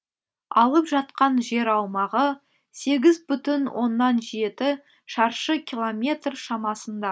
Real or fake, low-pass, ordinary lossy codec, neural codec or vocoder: real; none; none; none